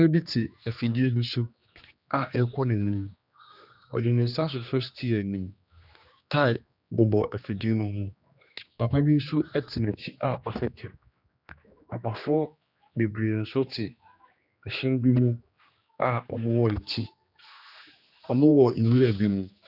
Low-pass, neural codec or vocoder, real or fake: 5.4 kHz; codec, 16 kHz, 2 kbps, X-Codec, HuBERT features, trained on general audio; fake